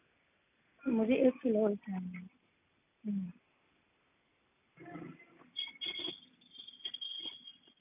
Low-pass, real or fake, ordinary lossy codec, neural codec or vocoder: 3.6 kHz; real; none; none